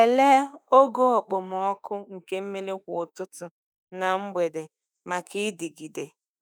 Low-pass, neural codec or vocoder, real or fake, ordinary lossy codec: none; autoencoder, 48 kHz, 32 numbers a frame, DAC-VAE, trained on Japanese speech; fake; none